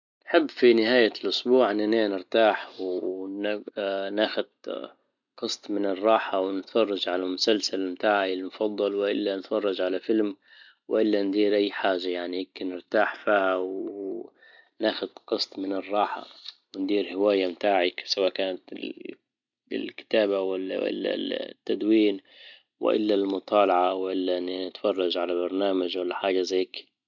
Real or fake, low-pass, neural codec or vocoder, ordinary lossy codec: real; 7.2 kHz; none; none